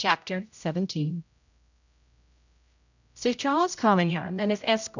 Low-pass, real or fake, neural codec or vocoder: 7.2 kHz; fake; codec, 16 kHz, 0.5 kbps, X-Codec, HuBERT features, trained on general audio